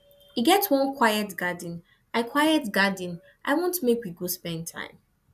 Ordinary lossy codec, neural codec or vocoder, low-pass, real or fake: none; none; 14.4 kHz; real